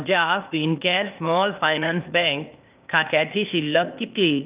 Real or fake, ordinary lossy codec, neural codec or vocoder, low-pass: fake; Opus, 24 kbps; codec, 16 kHz, 0.8 kbps, ZipCodec; 3.6 kHz